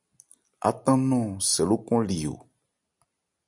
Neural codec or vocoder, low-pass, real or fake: none; 10.8 kHz; real